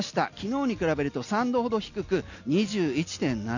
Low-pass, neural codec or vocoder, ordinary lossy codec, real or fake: 7.2 kHz; none; none; real